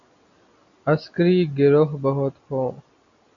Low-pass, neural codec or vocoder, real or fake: 7.2 kHz; none; real